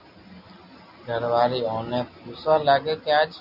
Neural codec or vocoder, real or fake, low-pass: none; real; 5.4 kHz